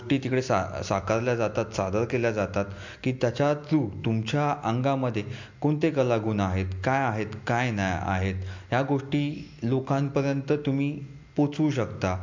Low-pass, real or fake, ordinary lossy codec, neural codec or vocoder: 7.2 kHz; real; MP3, 48 kbps; none